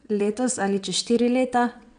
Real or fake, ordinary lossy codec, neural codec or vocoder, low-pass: fake; none; vocoder, 22.05 kHz, 80 mel bands, WaveNeXt; 9.9 kHz